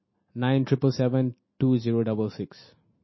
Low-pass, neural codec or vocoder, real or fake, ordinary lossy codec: 7.2 kHz; none; real; MP3, 24 kbps